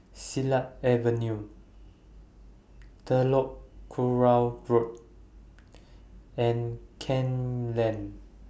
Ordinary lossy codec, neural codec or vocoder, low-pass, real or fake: none; none; none; real